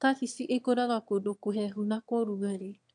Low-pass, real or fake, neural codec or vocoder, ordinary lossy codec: 9.9 kHz; fake; autoencoder, 22.05 kHz, a latent of 192 numbers a frame, VITS, trained on one speaker; none